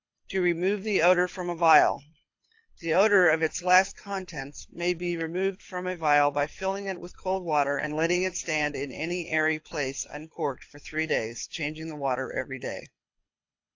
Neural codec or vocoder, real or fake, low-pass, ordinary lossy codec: codec, 24 kHz, 6 kbps, HILCodec; fake; 7.2 kHz; AAC, 48 kbps